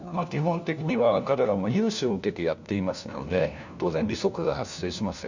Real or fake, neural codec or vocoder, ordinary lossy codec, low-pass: fake; codec, 16 kHz, 1 kbps, FunCodec, trained on LibriTTS, 50 frames a second; none; 7.2 kHz